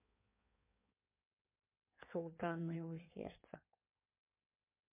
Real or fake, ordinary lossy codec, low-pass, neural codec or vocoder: fake; MP3, 24 kbps; 3.6 kHz; codec, 16 kHz in and 24 kHz out, 1.1 kbps, FireRedTTS-2 codec